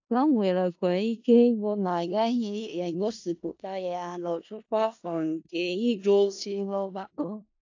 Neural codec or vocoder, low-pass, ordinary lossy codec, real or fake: codec, 16 kHz in and 24 kHz out, 0.4 kbps, LongCat-Audio-Codec, four codebook decoder; 7.2 kHz; AAC, 48 kbps; fake